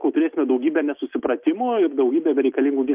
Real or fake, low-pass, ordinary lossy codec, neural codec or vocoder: real; 3.6 kHz; Opus, 32 kbps; none